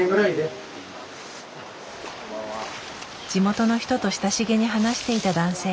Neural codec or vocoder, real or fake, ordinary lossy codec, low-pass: none; real; none; none